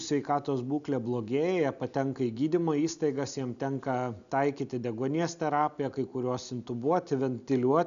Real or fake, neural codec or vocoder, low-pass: real; none; 7.2 kHz